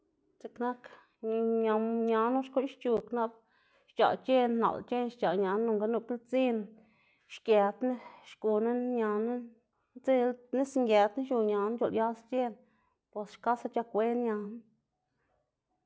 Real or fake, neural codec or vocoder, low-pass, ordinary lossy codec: real; none; none; none